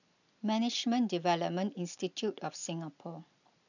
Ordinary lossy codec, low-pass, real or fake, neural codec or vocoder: none; 7.2 kHz; real; none